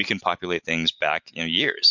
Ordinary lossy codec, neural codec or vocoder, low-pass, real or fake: MP3, 64 kbps; none; 7.2 kHz; real